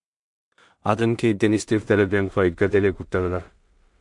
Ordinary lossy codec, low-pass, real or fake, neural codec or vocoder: MP3, 64 kbps; 10.8 kHz; fake; codec, 16 kHz in and 24 kHz out, 0.4 kbps, LongCat-Audio-Codec, two codebook decoder